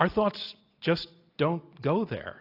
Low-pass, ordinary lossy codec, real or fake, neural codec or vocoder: 5.4 kHz; AAC, 32 kbps; real; none